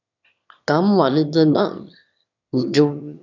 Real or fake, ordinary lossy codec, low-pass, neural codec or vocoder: fake; none; 7.2 kHz; autoencoder, 22.05 kHz, a latent of 192 numbers a frame, VITS, trained on one speaker